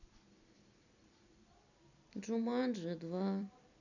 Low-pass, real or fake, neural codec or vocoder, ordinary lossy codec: 7.2 kHz; fake; vocoder, 44.1 kHz, 128 mel bands every 256 samples, BigVGAN v2; none